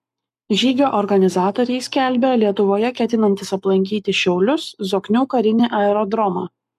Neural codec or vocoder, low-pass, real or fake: codec, 44.1 kHz, 7.8 kbps, Pupu-Codec; 14.4 kHz; fake